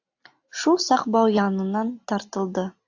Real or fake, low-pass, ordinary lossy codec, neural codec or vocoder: real; 7.2 kHz; AAC, 48 kbps; none